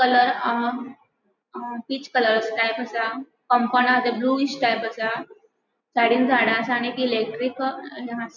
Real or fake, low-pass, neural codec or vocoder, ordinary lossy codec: real; 7.2 kHz; none; none